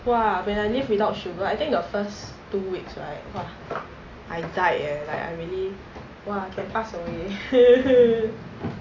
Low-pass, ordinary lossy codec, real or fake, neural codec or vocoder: 7.2 kHz; AAC, 32 kbps; real; none